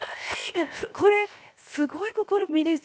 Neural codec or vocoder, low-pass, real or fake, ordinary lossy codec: codec, 16 kHz, 0.7 kbps, FocalCodec; none; fake; none